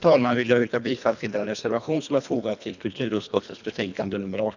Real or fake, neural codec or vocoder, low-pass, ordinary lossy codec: fake; codec, 24 kHz, 1.5 kbps, HILCodec; 7.2 kHz; none